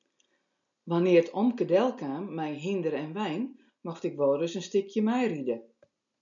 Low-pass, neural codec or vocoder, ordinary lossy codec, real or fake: 7.2 kHz; none; MP3, 96 kbps; real